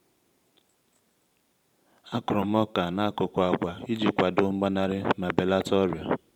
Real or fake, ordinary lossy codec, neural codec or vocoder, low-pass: fake; none; vocoder, 44.1 kHz, 128 mel bands every 512 samples, BigVGAN v2; 19.8 kHz